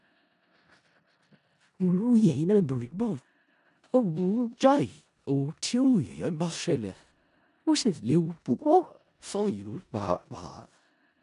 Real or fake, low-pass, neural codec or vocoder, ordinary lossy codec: fake; 10.8 kHz; codec, 16 kHz in and 24 kHz out, 0.4 kbps, LongCat-Audio-Codec, four codebook decoder; none